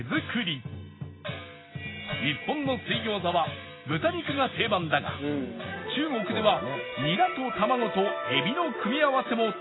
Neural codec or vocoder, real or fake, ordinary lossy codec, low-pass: none; real; AAC, 16 kbps; 7.2 kHz